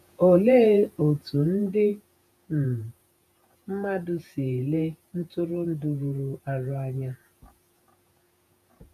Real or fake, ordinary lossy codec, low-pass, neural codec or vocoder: fake; none; 14.4 kHz; vocoder, 48 kHz, 128 mel bands, Vocos